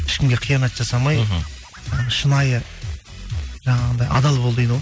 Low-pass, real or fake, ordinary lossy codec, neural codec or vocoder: none; real; none; none